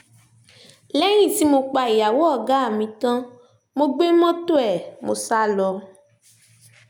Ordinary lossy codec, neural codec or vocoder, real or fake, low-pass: none; none; real; 19.8 kHz